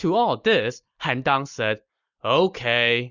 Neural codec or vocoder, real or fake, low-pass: none; real; 7.2 kHz